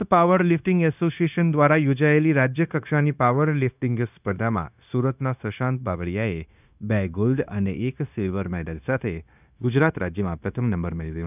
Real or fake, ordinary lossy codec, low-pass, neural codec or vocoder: fake; none; 3.6 kHz; codec, 16 kHz, 0.9 kbps, LongCat-Audio-Codec